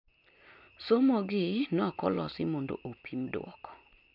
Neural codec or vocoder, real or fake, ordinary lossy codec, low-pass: none; real; MP3, 48 kbps; 5.4 kHz